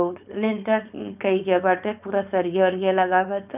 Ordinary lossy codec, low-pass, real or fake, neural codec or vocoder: none; 3.6 kHz; fake; codec, 16 kHz, 4.8 kbps, FACodec